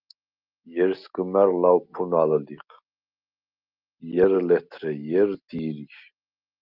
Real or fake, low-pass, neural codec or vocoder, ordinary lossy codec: real; 5.4 kHz; none; Opus, 24 kbps